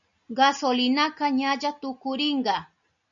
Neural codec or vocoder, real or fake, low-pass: none; real; 7.2 kHz